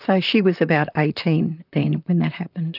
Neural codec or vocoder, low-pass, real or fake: vocoder, 44.1 kHz, 128 mel bands, Pupu-Vocoder; 5.4 kHz; fake